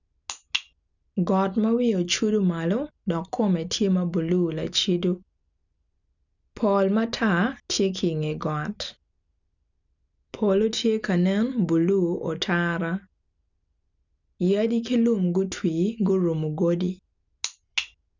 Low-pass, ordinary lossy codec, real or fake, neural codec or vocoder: 7.2 kHz; none; real; none